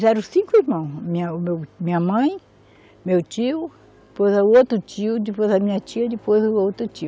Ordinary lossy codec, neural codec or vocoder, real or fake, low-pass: none; none; real; none